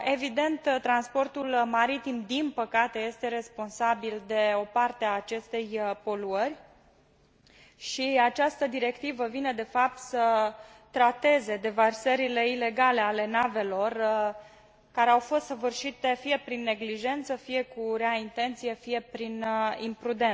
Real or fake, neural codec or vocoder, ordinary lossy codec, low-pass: real; none; none; none